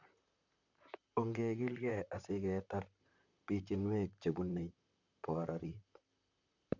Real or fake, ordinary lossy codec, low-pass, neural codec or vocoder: fake; none; 7.2 kHz; vocoder, 44.1 kHz, 128 mel bands, Pupu-Vocoder